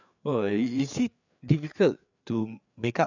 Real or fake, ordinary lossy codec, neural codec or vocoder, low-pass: fake; none; codec, 16 kHz, 4 kbps, FreqCodec, larger model; 7.2 kHz